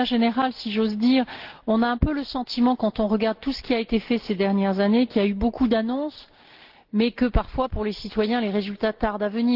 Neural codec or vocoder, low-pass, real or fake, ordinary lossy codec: none; 5.4 kHz; real; Opus, 16 kbps